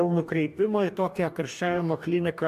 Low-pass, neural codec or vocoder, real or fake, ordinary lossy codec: 14.4 kHz; codec, 44.1 kHz, 2.6 kbps, DAC; fake; AAC, 96 kbps